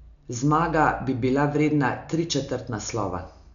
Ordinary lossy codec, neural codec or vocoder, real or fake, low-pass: none; none; real; 7.2 kHz